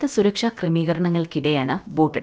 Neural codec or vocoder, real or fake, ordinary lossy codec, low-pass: codec, 16 kHz, about 1 kbps, DyCAST, with the encoder's durations; fake; none; none